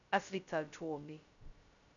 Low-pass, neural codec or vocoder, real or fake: 7.2 kHz; codec, 16 kHz, 0.2 kbps, FocalCodec; fake